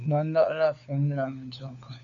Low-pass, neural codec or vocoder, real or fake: 7.2 kHz; codec, 16 kHz, 4 kbps, FunCodec, trained on LibriTTS, 50 frames a second; fake